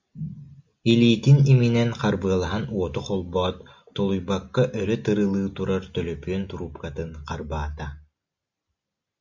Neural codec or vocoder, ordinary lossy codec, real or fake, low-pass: none; Opus, 64 kbps; real; 7.2 kHz